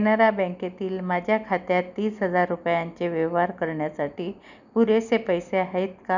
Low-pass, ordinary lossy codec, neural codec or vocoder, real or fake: 7.2 kHz; none; none; real